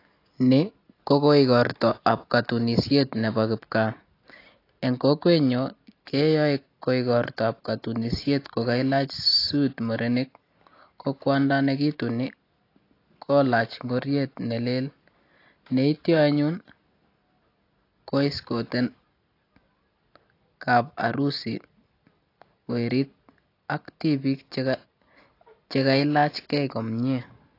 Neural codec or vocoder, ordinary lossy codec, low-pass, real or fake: none; AAC, 32 kbps; 5.4 kHz; real